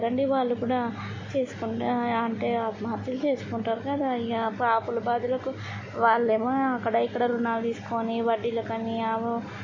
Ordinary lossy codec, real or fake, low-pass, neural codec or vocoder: MP3, 32 kbps; real; 7.2 kHz; none